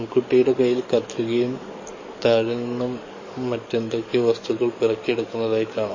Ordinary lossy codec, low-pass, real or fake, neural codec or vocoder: MP3, 32 kbps; 7.2 kHz; fake; codec, 44.1 kHz, 7.8 kbps, DAC